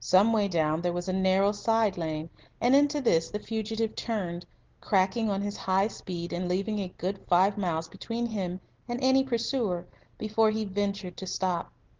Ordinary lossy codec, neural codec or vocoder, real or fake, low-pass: Opus, 16 kbps; none; real; 7.2 kHz